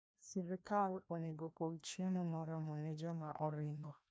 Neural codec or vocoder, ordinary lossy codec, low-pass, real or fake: codec, 16 kHz, 1 kbps, FreqCodec, larger model; none; none; fake